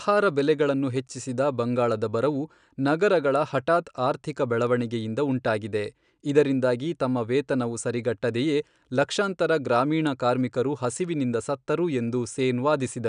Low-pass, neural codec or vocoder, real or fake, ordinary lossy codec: 9.9 kHz; none; real; none